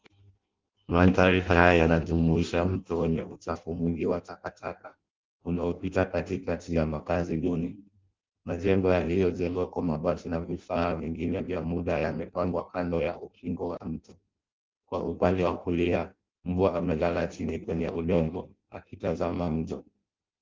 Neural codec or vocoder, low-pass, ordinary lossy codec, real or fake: codec, 16 kHz in and 24 kHz out, 0.6 kbps, FireRedTTS-2 codec; 7.2 kHz; Opus, 32 kbps; fake